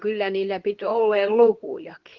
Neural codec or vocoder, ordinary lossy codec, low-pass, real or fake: codec, 24 kHz, 0.9 kbps, WavTokenizer, medium speech release version 2; Opus, 32 kbps; 7.2 kHz; fake